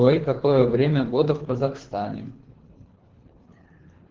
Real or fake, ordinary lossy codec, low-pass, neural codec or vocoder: fake; Opus, 16 kbps; 7.2 kHz; codec, 24 kHz, 3 kbps, HILCodec